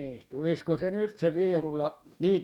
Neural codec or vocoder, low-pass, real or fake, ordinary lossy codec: codec, 44.1 kHz, 2.6 kbps, DAC; 19.8 kHz; fake; none